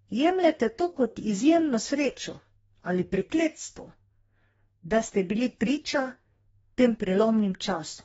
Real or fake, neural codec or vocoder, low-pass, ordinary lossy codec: fake; codec, 44.1 kHz, 2.6 kbps, DAC; 19.8 kHz; AAC, 24 kbps